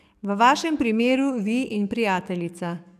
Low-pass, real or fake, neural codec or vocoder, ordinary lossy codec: 14.4 kHz; fake; codec, 44.1 kHz, 7.8 kbps, DAC; none